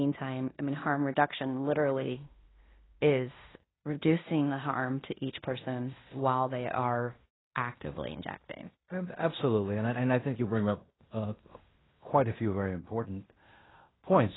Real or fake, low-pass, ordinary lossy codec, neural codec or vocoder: fake; 7.2 kHz; AAC, 16 kbps; codec, 16 kHz in and 24 kHz out, 0.9 kbps, LongCat-Audio-Codec, fine tuned four codebook decoder